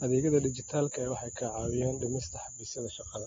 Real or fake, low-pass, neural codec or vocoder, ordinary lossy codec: real; 7.2 kHz; none; AAC, 24 kbps